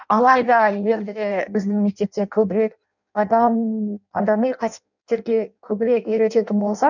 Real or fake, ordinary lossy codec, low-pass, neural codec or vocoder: fake; none; 7.2 kHz; codec, 16 kHz in and 24 kHz out, 0.6 kbps, FireRedTTS-2 codec